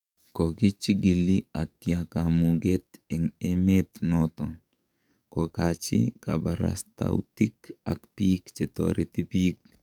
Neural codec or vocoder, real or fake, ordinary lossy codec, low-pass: codec, 44.1 kHz, 7.8 kbps, DAC; fake; none; 19.8 kHz